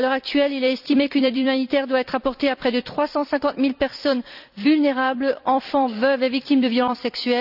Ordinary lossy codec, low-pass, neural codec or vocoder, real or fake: none; 5.4 kHz; vocoder, 44.1 kHz, 128 mel bands every 256 samples, BigVGAN v2; fake